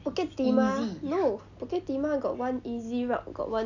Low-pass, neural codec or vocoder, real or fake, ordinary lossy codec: 7.2 kHz; none; real; none